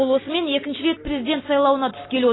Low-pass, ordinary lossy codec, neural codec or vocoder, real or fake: 7.2 kHz; AAC, 16 kbps; none; real